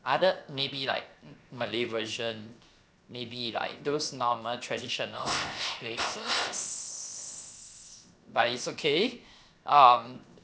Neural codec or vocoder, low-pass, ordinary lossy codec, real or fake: codec, 16 kHz, 0.7 kbps, FocalCodec; none; none; fake